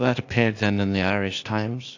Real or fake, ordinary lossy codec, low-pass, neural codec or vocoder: fake; AAC, 48 kbps; 7.2 kHz; codec, 16 kHz, 0.8 kbps, ZipCodec